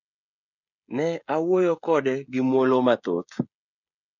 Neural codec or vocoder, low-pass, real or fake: codec, 16 kHz, 16 kbps, FreqCodec, smaller model; 7.2 kHz; fake